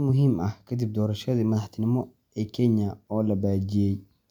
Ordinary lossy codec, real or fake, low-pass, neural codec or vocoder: none; real; 19.8 kHz; none